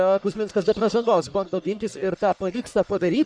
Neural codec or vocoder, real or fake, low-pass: codec, 44.1 kHz, 1.7 kbps, Pupu-Codec; fake; 9.9 kHz